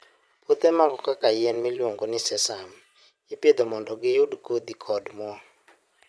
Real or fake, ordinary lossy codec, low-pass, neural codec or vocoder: fake; none; none; vocoder, 22.05 kHz, 80 mel bands, Vocos